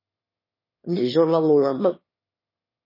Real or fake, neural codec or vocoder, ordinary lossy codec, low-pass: fake; autoencoder, 22.05 kHz, a latent of 192 numbers a frame, VITS, trained on one speaker; MP3, 24 kbps; 5.4 kHz